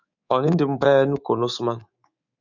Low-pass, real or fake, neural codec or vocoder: 7.2 kHz; fake; codec, 24 kHz, 3.1 kbps, DualCodec